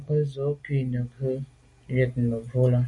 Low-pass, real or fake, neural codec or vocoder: 10.8 kHz; real; none